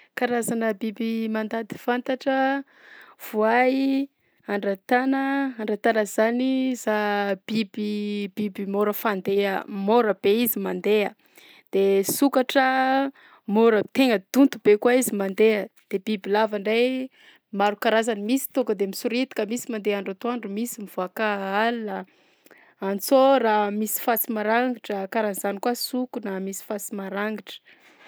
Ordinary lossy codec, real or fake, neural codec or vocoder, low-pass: none; real; none; none